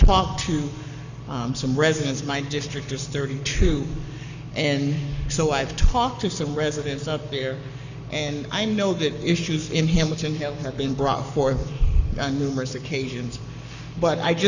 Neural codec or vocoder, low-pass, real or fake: codec, 44.1 kHz, 7.8 kbps, Pupu-Codec; 7.2 kHz; fake